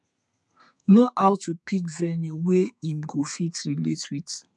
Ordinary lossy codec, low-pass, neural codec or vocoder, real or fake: MP3, 96 kbps; 10.8 kHz; codec, 32 kHz, 1.9 kbps, SNAC; fake